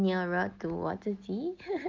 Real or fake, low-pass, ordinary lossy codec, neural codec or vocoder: real; 7.2 kHz; Opus, 24 kbps; none